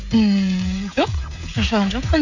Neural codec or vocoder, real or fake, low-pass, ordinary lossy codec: codec, 16 kHz, 16 kbps, FreqCodec, smaller model; fake; 7.2 kHz; none